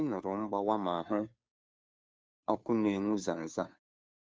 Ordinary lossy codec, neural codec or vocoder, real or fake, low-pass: none; codec, 16 kHz, 2 kbps, FunCodec, trained on Chinese and English, 25 frames a second; fake; none